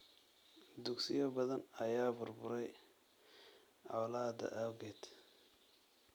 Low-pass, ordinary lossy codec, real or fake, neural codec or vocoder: none; none; real; none